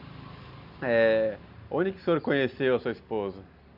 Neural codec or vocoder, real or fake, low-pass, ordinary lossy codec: none; real; 5.4 kHz; none